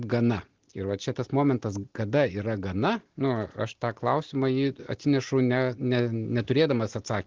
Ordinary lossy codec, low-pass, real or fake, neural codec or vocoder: Opus, 16 kbps; 7.2 kHz; real; none